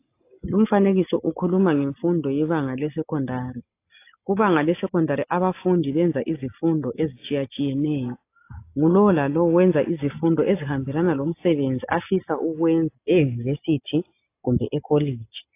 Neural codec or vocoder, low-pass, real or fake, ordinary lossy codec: none; 3.6 kHz; real; AAC, 24 kbps